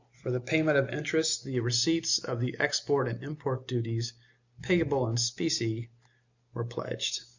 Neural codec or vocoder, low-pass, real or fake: none; 7.2 kHz; real